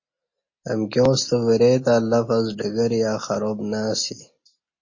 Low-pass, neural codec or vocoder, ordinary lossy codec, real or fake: 7.2 kHz; none; MP3, 32 kbps; real